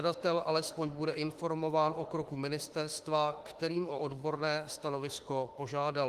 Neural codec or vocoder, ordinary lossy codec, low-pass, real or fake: autoencoder, 48 kHz, 32 numbers a frame, DAC-VAE, trained on Japanese speech; Opus, 24 kbps; 14.4 kHz; fake